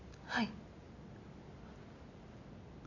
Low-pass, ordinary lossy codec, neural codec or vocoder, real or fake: 7.2 kHz; none; none; real